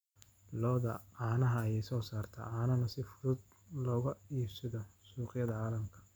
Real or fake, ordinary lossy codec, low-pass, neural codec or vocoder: real; none; none; none